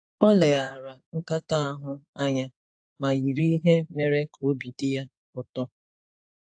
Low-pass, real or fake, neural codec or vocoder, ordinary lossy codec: 9.9 kHz; fake; codec, 16 kHz in and 24 kHz out, 2.2 kbps, FireRedTTS-2 codec; AAC, 64 kbps